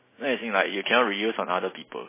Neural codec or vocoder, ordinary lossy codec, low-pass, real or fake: none; MP3, 16 kbps; 3.6 kHz; real